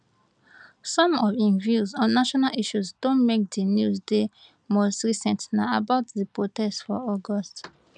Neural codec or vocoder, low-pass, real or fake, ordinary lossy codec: none; 9.9 kHz; real; none